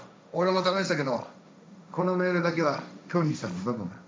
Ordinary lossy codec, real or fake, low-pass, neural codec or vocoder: none; fake; none; codec, 16 kHz, 1.1 kbps, Voila-Tokenizer